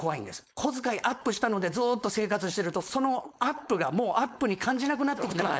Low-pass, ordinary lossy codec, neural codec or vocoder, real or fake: none; none; codec, 16 kHz, 4.8 kbps, FACodec; fake